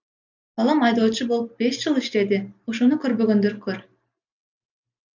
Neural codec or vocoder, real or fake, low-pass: none; real; 7.2 kHz